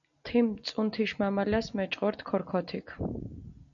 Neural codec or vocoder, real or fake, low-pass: none; real; 7.2 kHz